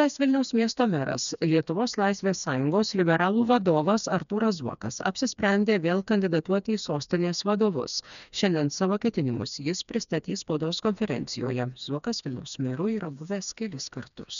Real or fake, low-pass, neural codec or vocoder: fake; 7.2 kHz; codec, 16 kHz, 2 kbps, FreqCodec, smaller model